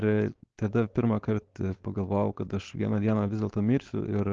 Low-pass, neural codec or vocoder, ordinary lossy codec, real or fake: 7.2 kHz; codec, 16 kHz, 4.8 kbps, FACodec; Opus, 16 kbps; fake